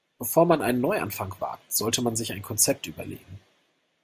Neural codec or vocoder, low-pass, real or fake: none; 14.4 kHz; real